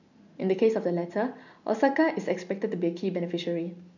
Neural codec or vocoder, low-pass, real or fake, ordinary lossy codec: none; 7.2 kHz; real; none